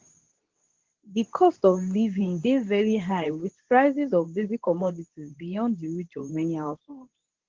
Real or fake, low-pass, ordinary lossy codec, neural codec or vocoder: fake; 7.2 kHz; Opus, 16 kbps; codec, 24 kHz, 0.9 kbps, WavTokenizer, medium speech release version 2